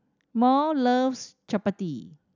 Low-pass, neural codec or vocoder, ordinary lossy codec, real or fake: 7.2 kHz; none; none; real